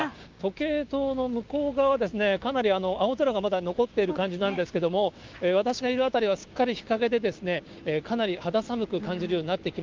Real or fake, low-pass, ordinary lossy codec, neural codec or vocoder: fake; 7.2 kHz; Opus, 24 kbps; codec, 16 kHz, 6 kbps, DAC